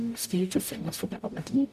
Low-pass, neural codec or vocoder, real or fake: 14.4 kHz; codec, 44.1 kHz, 0.9 kbps, DAC; fake